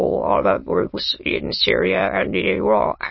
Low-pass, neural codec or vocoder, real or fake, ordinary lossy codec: 7.2 kHz; autoencoder, 22.05 kHz, a latent of 192 numbers a frame, VITS, trained on many speakers; fake; MP3, 24 kbps